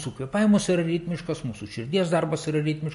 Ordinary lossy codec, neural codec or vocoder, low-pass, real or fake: MP3, 48 kbps; none; 10.8 kHz; real